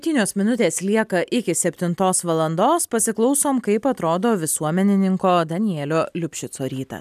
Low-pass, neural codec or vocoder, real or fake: 14.4 kHz; none; real